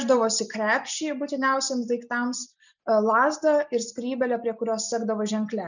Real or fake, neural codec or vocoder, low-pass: real; none; 7.2 kHz